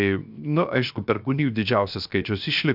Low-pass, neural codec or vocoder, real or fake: 5.4 kHz; codec, 16 kHz, 0.7 kbps, FocalCodec; fake